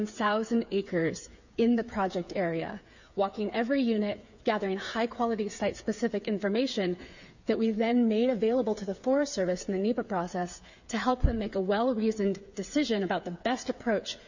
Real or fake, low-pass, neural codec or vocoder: fake; 7.2 kHz; codec, 16 kHz, 8 kbps, FreqCodec, smaller model